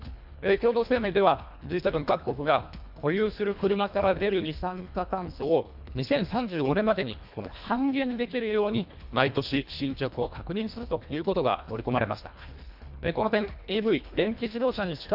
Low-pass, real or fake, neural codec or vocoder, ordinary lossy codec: 5.4 kHz; fake; codec, 24 kHz, 1.5 kbps, HILCodec; AAC, 48 kbps